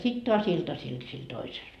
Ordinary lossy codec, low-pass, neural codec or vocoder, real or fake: none; 14.4 kHz; none; real